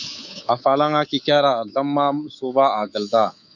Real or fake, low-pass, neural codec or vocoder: fake; 7.2 kHz; codec, 24 kHz, 3.1 kbps, DualCodec